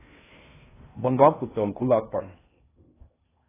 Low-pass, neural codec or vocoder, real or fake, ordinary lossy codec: 3.6 kHz; codec, 16 kHz in and 24 kHz out, 0.6 kbps, FocalCodec, streaming, 4096 codes; fake; AAC, 16 kbps